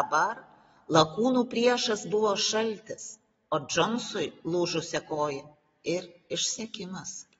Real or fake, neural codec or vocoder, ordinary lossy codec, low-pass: real; none; AAC, 24 kbps; 10.8 kHz